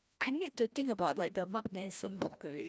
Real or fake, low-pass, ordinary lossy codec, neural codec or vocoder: fake; none; none; codec, 16 kHz, 1 kbps, FreqCodec, larger model